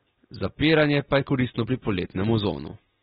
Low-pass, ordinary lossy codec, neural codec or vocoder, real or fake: 10.8 kHz; AAC, 16 kbps; none; real